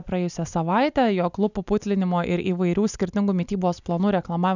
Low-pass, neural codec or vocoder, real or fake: 7.2 kHz; none; real